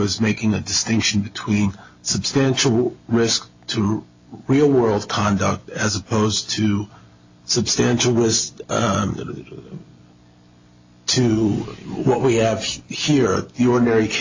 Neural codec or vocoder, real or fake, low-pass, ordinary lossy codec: none; real; 7.2 kHz; AAC, 48 kbps